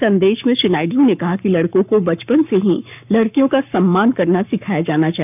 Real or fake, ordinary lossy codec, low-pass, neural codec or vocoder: fake; none; 3.6 kHz; codec, 44.1 kHz, 7.8 kbps, Pupu-Codec